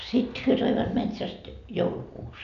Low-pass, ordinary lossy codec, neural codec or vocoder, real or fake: 7.2 kHz; none; none; real